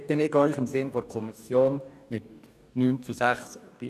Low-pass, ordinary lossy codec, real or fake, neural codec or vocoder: 14.4 kHz; none; fake; codec, 44.1 kHz, 2.6 kbps, DAC